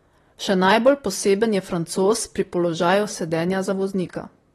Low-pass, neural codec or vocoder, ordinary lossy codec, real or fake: 19.8 kHz; vocoder, 44.1 kHz, 128 mel bands, Pupu-Vocoder; AAC, 32 kbps; fake